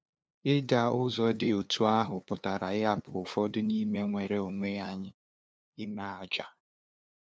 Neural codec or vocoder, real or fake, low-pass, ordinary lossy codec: codec, 16 kHz, 2 kbps, FunCodec, trained on LibriTTS, 25 frames a second; fake; none; none